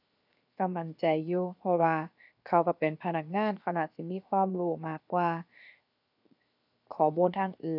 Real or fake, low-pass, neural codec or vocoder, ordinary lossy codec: fake; 5.4 kHz; codec, 16 kHz, 0.7 kbps, FocalCodec; none